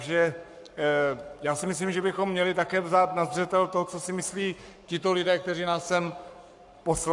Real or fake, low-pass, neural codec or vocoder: fake; 10.8 kHz; codec, 44.1 kHz, 7.8 kbps, Pupu-Codec